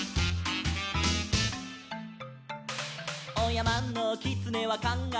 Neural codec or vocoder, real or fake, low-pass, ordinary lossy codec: none; real; none; none